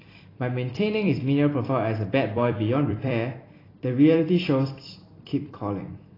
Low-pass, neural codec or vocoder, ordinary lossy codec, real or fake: 5.4 kHz; vocoder, 44.1 kHz, 128 mel bands every 256 samples, BigVGAN v2; AAC, 24 kbps; fake